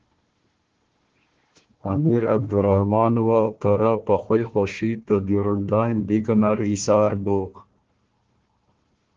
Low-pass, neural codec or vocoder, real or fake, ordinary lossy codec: 7.2 kHz; codec, 16 kHz, 1 kbps, FunCodec, trained on Chinese and English, 50 frames a second; fake; Opus, 16 kbps